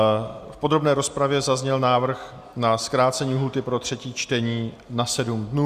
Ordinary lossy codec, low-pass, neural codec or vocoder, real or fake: Opus, 64 kbps; 14.4 kHz; none; real